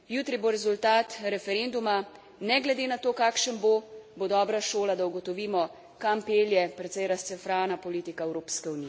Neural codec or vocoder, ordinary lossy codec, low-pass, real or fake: none; none; none; real